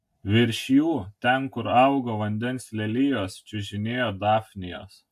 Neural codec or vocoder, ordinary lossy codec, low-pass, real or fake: none; AAC, 96 kbps; 14.4 kHz; real